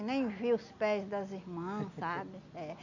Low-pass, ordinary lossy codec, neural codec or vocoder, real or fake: 7.2 kHz; none; none; real